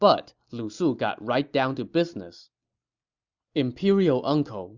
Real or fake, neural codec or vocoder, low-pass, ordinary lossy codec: real; none; 7.2 kHz; Opus, 64 kbps